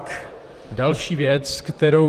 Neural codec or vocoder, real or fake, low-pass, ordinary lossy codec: vocoder, 44.1 kHz, 128 mel bands, Pupu-Vocoder; fake; 14.4 kHz; Opus, 24 kbps